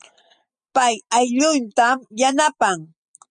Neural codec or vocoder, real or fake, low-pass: none; real; 9.9 kHz